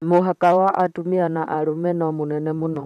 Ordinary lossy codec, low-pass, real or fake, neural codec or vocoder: none; 14.4 kHz; fake; vocoder, 44.1 kHz, 128 mel bands, Pupu-Vocoder